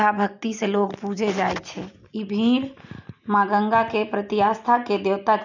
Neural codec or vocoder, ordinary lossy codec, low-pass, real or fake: none; none; 7.2 kHz; real